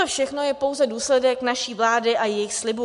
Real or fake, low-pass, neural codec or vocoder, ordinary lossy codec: real; 10.8 kHz; none; MP3, 64 kbps